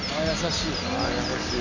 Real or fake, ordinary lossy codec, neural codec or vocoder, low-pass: real; none; none; 7.2 kHz